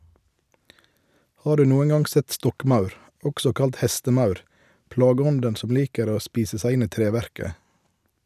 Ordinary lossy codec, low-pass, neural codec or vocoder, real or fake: none; 14.4 kHz; none; real